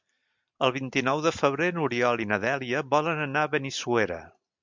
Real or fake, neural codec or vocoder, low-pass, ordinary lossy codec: real; none; 7.2 kHz; MP3, 64 kbps